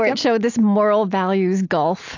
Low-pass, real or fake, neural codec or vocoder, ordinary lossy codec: 7.2 kHz; real; none; AAC, 48 kbps